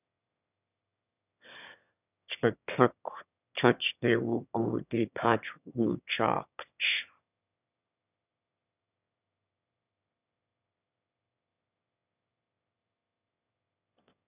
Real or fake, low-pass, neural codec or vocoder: fake; 3.6 kHz; autoencoder, 22.05 kHz, a latent of 192 numbers a frame, VITS, trained on one speaker